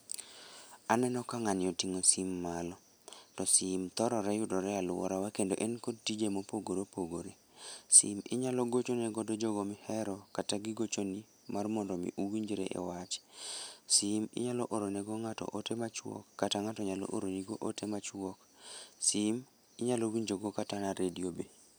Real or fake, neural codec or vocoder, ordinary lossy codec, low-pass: real; none; none; none